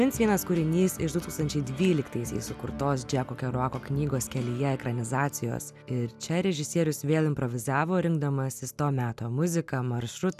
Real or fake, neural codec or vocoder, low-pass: real; none; 14.4 kHz